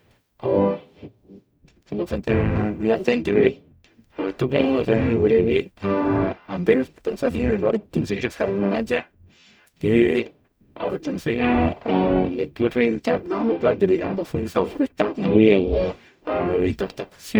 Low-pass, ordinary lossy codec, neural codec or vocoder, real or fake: none; none; codec, 44.1 kHz, 0.9 kbps, DAC; fake